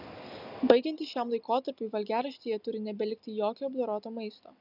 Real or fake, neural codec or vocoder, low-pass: real; none; 5.4 kHz